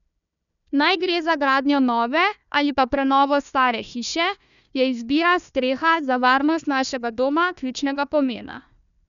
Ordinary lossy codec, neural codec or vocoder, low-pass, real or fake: none; codec, 16 kHz, 1 kbps, FunCodec, trained on Chinese and English, 50 frames a second; 7.2 kHz; fake